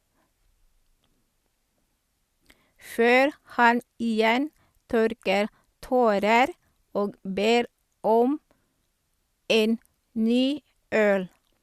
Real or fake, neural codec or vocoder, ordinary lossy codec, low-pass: real; none; Opus, 64 kbps; 14.4 kHz